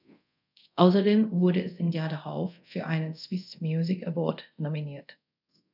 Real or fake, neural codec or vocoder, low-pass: fake; codec, 24 kHz, 0.5 kbps, DualCodec; 5.4 kHz